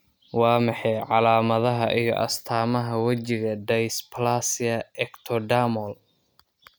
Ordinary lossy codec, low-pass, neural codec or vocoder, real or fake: none; none; none; real